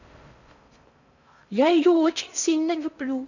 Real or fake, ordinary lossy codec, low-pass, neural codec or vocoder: fake; none; 7.2 kHz; codec, 16 kHz in and 24 kHz out, 0.6 kbps, FocalCodec, streaming, 4096 codes